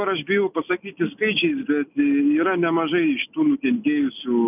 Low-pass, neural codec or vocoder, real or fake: 3.6 kHz; none; real